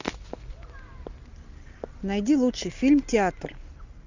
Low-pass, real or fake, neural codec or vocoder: 7.2 kHz; real; none